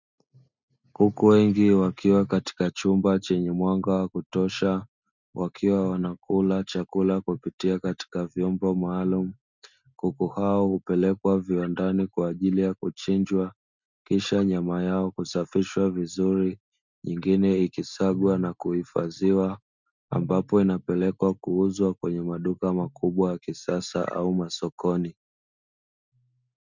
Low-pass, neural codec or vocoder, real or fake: 7.2 kHz; none; real